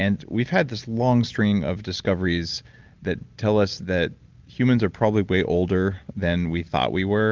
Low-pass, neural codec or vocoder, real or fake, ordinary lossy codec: 7.2 kHz; none; real; Opus, 32 kbps